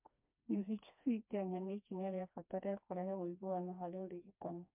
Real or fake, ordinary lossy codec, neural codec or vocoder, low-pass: fake; MP3, 32 kbps; codec, 16 kHz, 2 kbps, FreqCodec, smaller model; 3.6 kHz